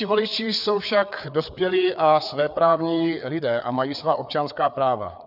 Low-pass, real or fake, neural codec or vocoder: 5.4 kHz; fake; codec, 16 kHz, 4 kbps, FreqCodec, larger model